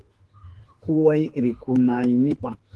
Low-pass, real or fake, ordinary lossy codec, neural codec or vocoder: 10.8 kHz; fake; Opus, 16 kbps; autoencoder, 48 kHz, 32 numbers a frame, DAC-VAE, trained on Japanese speech